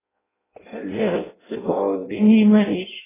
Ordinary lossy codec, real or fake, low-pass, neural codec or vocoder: AAC, 16 kbps; fake; 3.6 kHz; codec, 16 kHz in and 24 kHz out, 0.6 kbps, FireRedTTS-2 codec